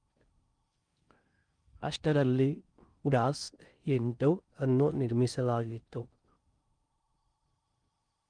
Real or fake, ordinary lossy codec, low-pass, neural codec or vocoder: fake; Opus, 32 kbps; 9.9 kHz; codec, 16 kHz in and 24 kHz out, 0.6 kbps, FocalCodec, streaming, 4096 codes